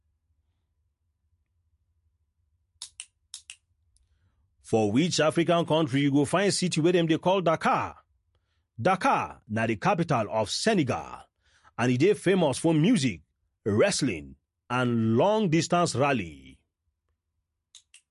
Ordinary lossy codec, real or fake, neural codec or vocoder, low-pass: MP3, 48 kbps; real; none; 14.4 kHz